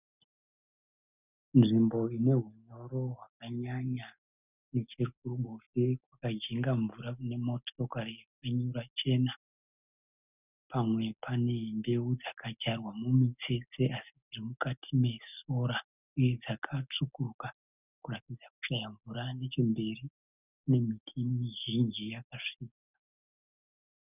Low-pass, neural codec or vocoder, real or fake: 3.6 kHz; none; real